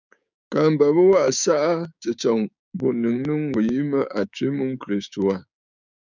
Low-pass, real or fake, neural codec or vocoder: 7.2 kHz; fake; codec, 16 kHz, 6 kbps, DAC